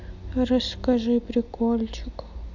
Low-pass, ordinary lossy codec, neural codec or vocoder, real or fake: 7.2 kHz; none; none; real